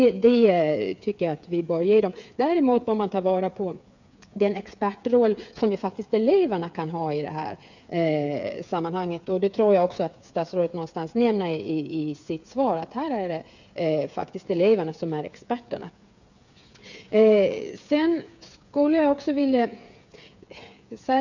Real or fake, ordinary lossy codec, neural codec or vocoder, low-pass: fake; none; codec, 16 kHz, 8 kbps, FreqCodec, smaller model; 7.2 kHz